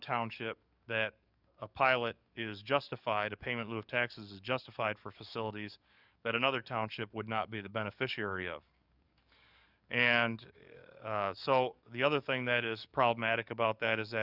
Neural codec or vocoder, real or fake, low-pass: codec, 44.1 kHz, 7.8 kbps, DAC; fake; 5.4 kHz